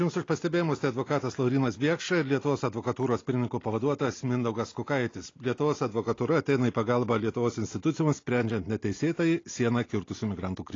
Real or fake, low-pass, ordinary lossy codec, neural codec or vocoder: real; 7.2 kHz; AAC, 32 kbps; none